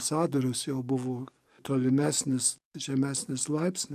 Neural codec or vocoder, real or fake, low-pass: codec, 44.1 kHz, 7.8 kbps, DAC; fake; 14.4 kHz